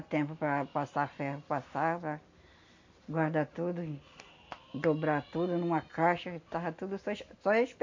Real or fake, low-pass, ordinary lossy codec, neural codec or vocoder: real; 7.2 kHz; AAC, 48 kbps; none